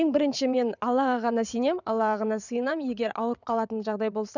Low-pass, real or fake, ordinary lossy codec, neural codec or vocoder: 7.2 kHz; fake; none; vocoder, 22.05 kHz, 80 mel bands, WaveNeXt